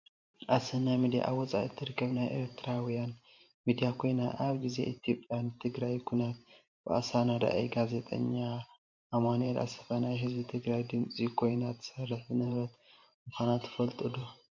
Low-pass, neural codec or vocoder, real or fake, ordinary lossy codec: 7.2 kHz; vocoder, 44.1 kHz, 128 mel bands every 512 samples, BigVGAN v2; fake; MP3, 48 kbps